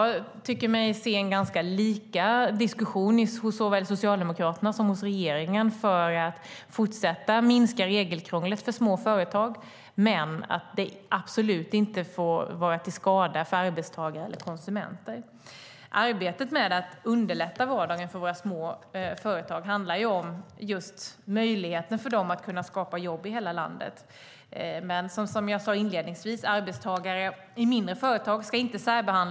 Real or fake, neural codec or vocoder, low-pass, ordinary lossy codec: real; none; none; none